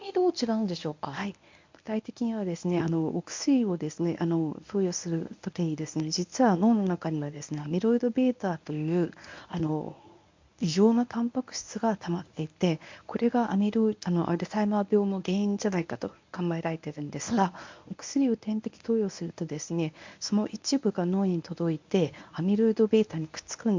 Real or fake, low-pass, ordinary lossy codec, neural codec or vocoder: fake; 7.2 kHz; MP3, 64 kbps; codec, 24 kHz, 0.9 kbps, WavTokenizer, medium speech release version 1